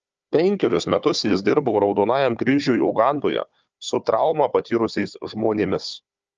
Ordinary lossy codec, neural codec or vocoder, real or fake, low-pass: Opus, 24 kbps; codec, 16 kHz, 4 kbps, FunCodec, trained on Chinese and English, 50 frames a second; fake; 7.2 kHz